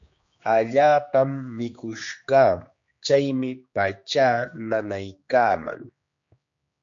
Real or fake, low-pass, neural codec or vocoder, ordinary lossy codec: fake; 7.2 kHz; codec, 16 kHz, 2 kbps, X-Codec, HuBERT features, trained on general audio; MP3, 48 kbps